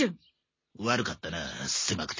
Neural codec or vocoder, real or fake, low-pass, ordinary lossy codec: none; real; 7.2 kHz; MP3, 32 kbps